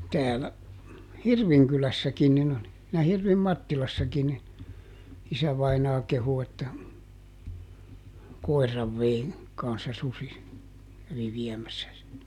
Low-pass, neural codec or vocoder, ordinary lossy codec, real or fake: 19.8 kHz; none; none; real